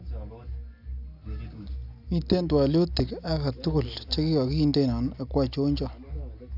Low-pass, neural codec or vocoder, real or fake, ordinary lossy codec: 5.4 kHz; none; real; none